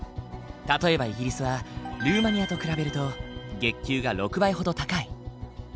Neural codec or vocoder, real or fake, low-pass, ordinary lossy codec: none; real; none; none